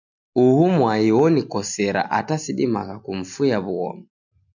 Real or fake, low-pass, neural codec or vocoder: real; 7.2 kHz; none